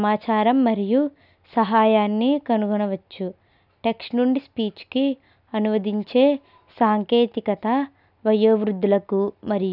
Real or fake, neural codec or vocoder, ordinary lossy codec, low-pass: real; none; none; 5.4 kHz